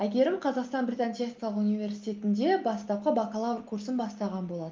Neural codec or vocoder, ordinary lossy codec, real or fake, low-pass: none; Opus, 32 kbps; real; 7.2 kHz